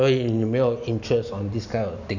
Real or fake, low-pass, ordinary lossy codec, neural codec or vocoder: real; 7.2 kHz; none; none